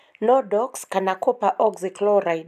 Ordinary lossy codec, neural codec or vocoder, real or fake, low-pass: none; none; real; 14.4 kHz